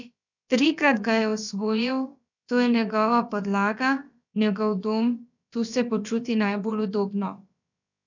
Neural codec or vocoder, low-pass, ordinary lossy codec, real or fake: codec, 16 kHz, about 1 kbps, DyCAST, with the encoder's durations; 7.2 kHz; none; fake